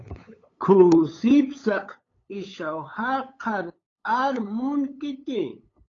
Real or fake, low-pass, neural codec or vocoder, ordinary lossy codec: fake; 7.2 kHz; codec, 16 kHz, 8 kbps, FunCodec, trained on LibriTTS, 25 frames a second; AAC, 32 kbps